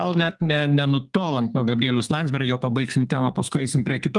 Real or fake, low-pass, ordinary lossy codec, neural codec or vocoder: fake; 10.8 kHz; Opus, 32 kbps; codec, 32 kHz, 1.9 kbps, SNAC